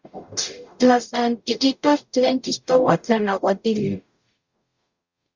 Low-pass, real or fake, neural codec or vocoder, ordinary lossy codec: 7.2 kHz; fake; codec, 44.1 kHz, 0.9 kbps, DAC; Opus, 64 kbps